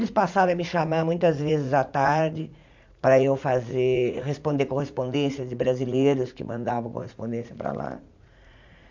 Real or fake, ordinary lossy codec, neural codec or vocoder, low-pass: fake; MP3, 64 kbps; vocoder, 44.1 kHz, 80 mel bands, Vocos; 7.2 kHz